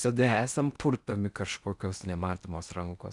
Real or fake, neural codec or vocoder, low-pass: fake; codec, 16 kHz in and 24 kHz out, 0.8 kbps, FocalCodec, streaming, 65536 codes; 10.8 kHz